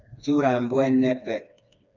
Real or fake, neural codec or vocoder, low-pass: fake; codec, 16 kHz, 2 kbps, FreqCodec, smaller model; 7.2 kHz